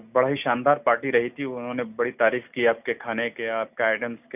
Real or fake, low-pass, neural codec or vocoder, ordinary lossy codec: real; 3.6 kHz; none; none